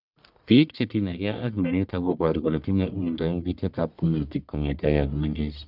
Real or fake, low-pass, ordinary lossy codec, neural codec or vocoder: fake; 5.4 kHz; none; codec, 44.1 kHz, 1.7 kbps, Pupu-Codec